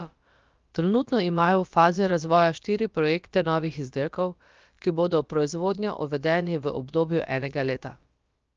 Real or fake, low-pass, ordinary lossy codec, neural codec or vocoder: fake; 7.2 kHz; Opus, 24 kbps; codec, 16 kHz, about 1 kbps, DyCAST, with the encoder's durations